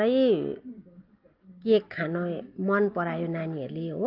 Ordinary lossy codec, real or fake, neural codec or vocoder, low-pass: Opus, 32 kbps; real; none; 5.4 kHz